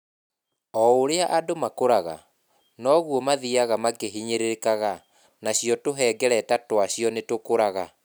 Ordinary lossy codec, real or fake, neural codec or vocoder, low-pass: none; real; none; none